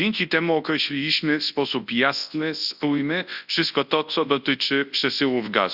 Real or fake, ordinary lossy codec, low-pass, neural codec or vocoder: fake; Opus, 64 kbps; 5.4 kHz; codec, 24 kHz, 0.9 kbps, WavTokenizer, large speech release